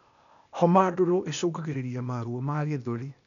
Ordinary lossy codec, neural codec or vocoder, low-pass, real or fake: none; codec, 16 kHz, 0.8 kbps, ZipCodec; 7.2 kHz; fake